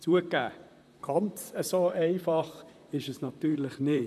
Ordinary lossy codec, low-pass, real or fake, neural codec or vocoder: none; 14.4 kHz; fake; vocoder, 44.1 kHz, 128 mel bands, Pupu-Vocoder